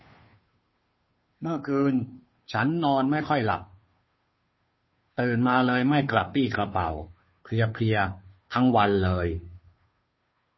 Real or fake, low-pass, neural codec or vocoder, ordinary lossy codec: fake; 7.2 kHz; codec, 16 kHz, 2 kbps, FunCodec, trained on Chinese and English, 25 frames a second; MP3, 24 kbps